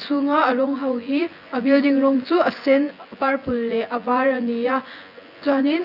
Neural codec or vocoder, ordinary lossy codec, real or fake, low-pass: vocoder, 24 kHz, 100 mel bands, Vocos; none; fake; 5.4 kHz